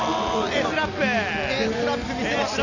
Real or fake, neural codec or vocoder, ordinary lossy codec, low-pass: real; none; none; 7.2 kHz